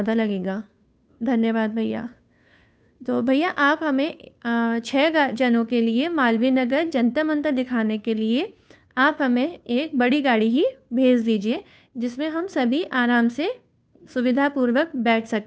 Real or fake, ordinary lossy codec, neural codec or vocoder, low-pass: fake; none; codec, 16 kHz, 2 kbps, FunCodec, trained on Chinese and English, 25 frames a second; none